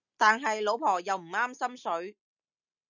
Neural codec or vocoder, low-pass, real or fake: none; 7.2 kHz; real